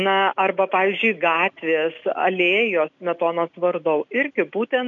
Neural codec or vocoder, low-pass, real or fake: none; 7.2 kHz; real